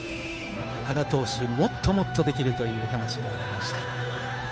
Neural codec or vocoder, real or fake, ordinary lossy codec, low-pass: codec, 16 kHz, 2 kbps, FunCodec, trained on Chinese and English, 25 frames a second; fake; none; none